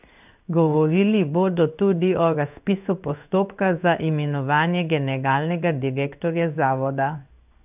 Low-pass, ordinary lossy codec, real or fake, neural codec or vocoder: 3.6 kHz; none; fake; codec, 16 kHz in and 24 kHz out, 1 kbps, XY-Tokenizer